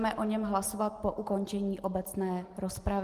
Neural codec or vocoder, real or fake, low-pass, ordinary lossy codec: none; real; 14.4 kHz; Opus, 24 kbps